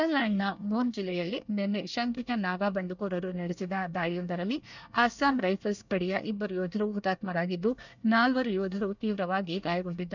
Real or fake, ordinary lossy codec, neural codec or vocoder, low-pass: fake; none; codec, 24 kHz, 1 kbps, SNAC; 7.2 kHz